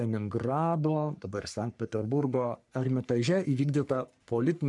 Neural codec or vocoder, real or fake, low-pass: codec, 44.1 kHz, 3.4 kbps, Pupu-Codec; fake; 10.8 kHz